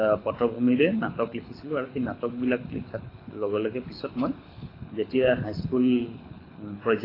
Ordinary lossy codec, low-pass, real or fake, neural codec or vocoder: AAC, 24 kbps; 5.4 kHz; fake; codec, 24 kHz, 6 kbps, HILCodec